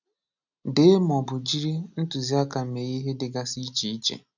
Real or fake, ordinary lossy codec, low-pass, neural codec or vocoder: real; none; 7.2 kHz; none